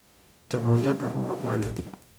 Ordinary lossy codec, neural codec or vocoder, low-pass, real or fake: none; codec, 44.1 kHz, 0.9 kbps, DAC; none; fake